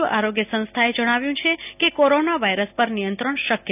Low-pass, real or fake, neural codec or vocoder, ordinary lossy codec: 3.6 kHz; real; none; none